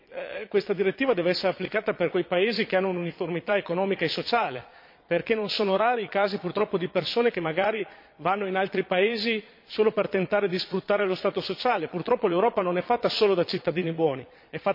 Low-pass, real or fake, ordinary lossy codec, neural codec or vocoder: 5.4 kHz; fake; MP3, 32 kbps; vocoder, 22.05 kHz, 80 mel bands, Vocos